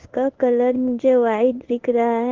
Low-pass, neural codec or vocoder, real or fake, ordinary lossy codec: 7.2 kHz; codec, 16 kHz in and 24 kHz out, 1 kbps, XY-Tokenizer; fake; Opus, 16 kbps